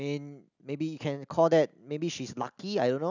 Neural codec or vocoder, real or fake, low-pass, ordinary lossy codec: none; real; 7.2 kHz; none